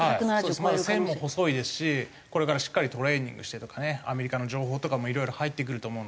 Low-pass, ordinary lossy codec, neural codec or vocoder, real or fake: none; none; none; real